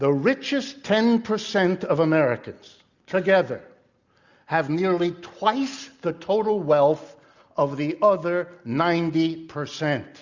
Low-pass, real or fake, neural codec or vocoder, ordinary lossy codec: 7.2 kHz; real; none; Opus, 64 kbps